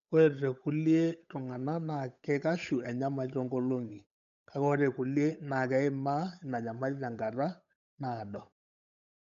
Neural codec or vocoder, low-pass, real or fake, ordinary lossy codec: codec, 16 kHz, 8 kbps, FunCodec, trained on Chinese and English, 25 frames a second; 7.2 kHz; fake; none